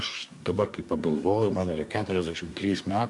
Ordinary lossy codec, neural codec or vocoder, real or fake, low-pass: MP3, 96 kbps; codec, 24 kHz, 1 kbps, SNAC; fake; 10.8 kHz